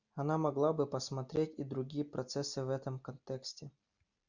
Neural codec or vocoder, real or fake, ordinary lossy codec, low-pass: none; real; Opus, 64 kbps; 7.2 kHz